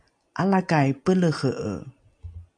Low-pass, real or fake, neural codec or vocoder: 9.9 kHz; real; none